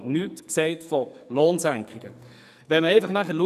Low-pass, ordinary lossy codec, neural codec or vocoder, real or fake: 14.4 kHz; none; codec, 44.1 kHz, 2.6 kbps, SNAC; fake